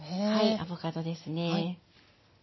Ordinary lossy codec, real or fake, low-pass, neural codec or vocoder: MP3, 24 kbps; real; 7.2 kHz; none